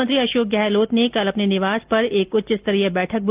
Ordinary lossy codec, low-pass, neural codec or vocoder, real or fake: Opus, 24 kbps; 3.6 kHz; none; real